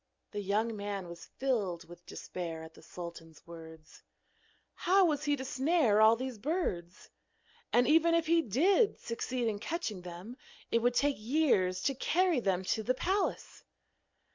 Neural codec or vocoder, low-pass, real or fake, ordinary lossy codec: none; 7.2 kHz; real; MP3, 64 kbps